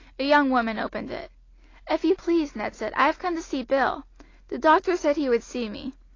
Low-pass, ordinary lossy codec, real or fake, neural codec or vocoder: 7.2 kHz; AAC, 32 kbps; real; none